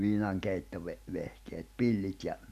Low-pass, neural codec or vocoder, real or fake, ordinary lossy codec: 14.4 kHz; none; real; none